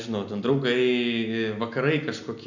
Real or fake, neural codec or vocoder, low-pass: real; none; 7.2 kHz